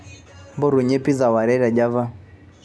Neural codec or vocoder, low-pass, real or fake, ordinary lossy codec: none; none; real; none